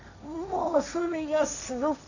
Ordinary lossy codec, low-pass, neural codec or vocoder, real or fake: none; 7.2 kHz; codec, 16 kHz, 1.1 kbps, Voila-Tokenizer; fake